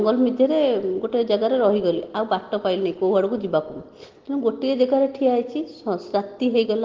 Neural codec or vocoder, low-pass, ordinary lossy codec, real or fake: none; 7.2 kHz; Opus, 16 kbps; real